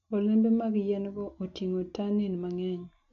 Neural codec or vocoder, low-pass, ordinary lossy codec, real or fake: none; 7.2 kHz; MP3, 48 kbps; real